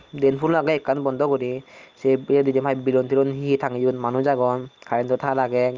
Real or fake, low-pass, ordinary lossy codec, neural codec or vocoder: real; 7.2 kHz; Opus, 24 kbps; none